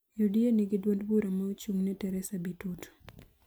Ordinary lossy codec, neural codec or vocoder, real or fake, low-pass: none; none; real; none